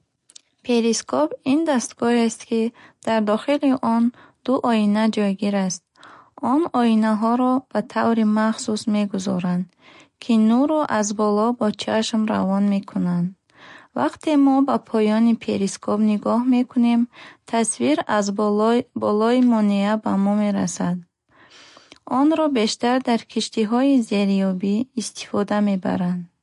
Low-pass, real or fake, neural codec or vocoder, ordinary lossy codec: 14.4 kHz; real; none; MP3, 48 kbps